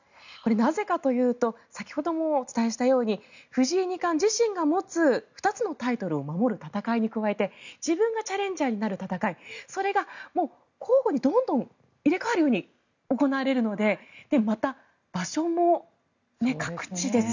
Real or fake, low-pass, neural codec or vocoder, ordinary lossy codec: real; 7.2 kHz; none; none